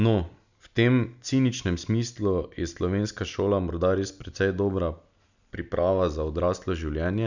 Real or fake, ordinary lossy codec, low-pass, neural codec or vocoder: real; none; 7.2 kHz; none